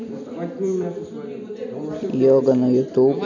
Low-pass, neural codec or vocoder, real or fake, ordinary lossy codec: 7.2 kHz; none; real; none